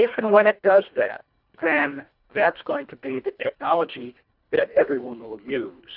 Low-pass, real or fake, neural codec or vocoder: 5.4 kHz; fake; codec, 24 kHz, 1.5 kbps, HILCodec